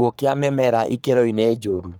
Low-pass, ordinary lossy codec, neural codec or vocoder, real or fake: none; none; codec, 44.1 kHz, 3.4 kbps, Pupu-Codec; fake